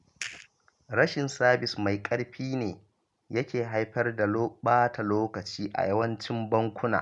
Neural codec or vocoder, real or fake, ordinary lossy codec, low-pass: none; real; none; 10.8 kHz